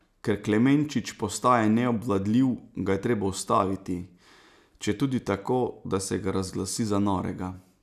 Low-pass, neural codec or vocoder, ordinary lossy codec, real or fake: 14.4 kHz; none; none; real